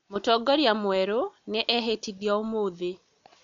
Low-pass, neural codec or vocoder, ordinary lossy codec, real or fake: 7.2 kHz; none; MP3, 64 kbps; real